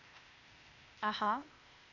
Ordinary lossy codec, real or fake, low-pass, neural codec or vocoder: none; fake; 7.2 kHz; codec, 16 kHz, 0.8 kbps, ZipCodec